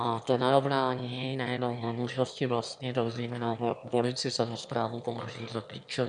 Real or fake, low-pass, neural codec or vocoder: fake; 9.9 kHz; autoencoder, 22.05 kHz, a latent of 192 numbers a frame, VITS, trained on one speaker